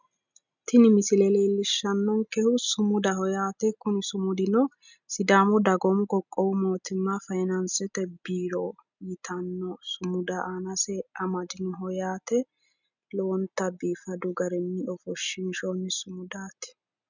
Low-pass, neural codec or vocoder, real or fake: 7.2 kHz; none; real